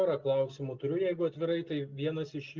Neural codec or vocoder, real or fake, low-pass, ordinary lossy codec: none; real; 7.2 kHz; Opus, 32 kbps